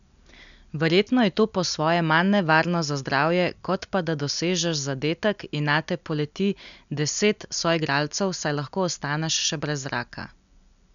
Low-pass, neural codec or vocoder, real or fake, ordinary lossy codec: 7.2 kHz; none; real; none